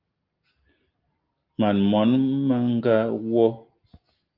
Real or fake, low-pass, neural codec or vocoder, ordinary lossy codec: real; 5.4 kHz; none; Opus, 32 kbps